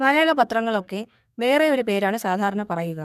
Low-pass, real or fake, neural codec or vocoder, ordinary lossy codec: 14.4 kHz; fake; codec, 32 kHz, 1.9 kbps, SNAC; none